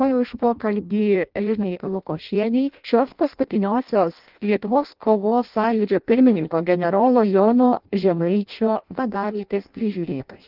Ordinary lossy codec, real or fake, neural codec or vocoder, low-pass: Opus, 24 kbps; fake; codec, 16 kHz in and 24 kHz out, 0.6 kbps, FireRedTTS-2 codec; 5.4 kHz